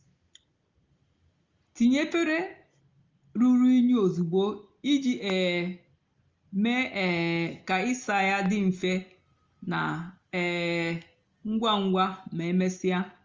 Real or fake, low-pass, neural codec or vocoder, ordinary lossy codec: real; 7.2 kHz; none; Opus, 32 kbps